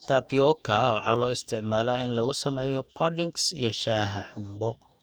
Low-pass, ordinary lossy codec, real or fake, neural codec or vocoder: none; none; fake; codec, 44.1 kHz, 2.6 kbps, DAC